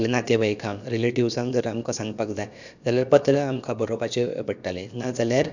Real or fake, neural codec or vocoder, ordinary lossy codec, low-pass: fake; codec, 16 kHz, about 1 kbps, DyCAST, with the encoder's durations; none; 7.2 kHz